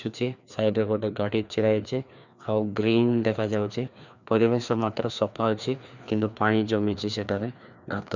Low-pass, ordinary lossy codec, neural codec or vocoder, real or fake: 7.2 kHz; none; codec, 16 kHz, 2 kbps, FreqCodec, larger model; fake